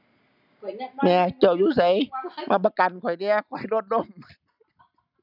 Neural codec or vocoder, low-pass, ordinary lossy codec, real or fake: none; 5.4 kHz; none; real